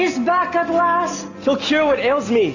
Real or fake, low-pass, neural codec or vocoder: real; 7.2 kHz; none